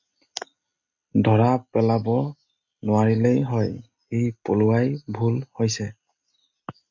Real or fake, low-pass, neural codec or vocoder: real; 7.2 kHz; none